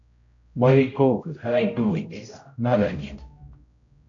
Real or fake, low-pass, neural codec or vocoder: fake; 7.2 kHz; codec, 16 kHz, 0.5 kbps, X-Codec, HuBERT features, trained on general audio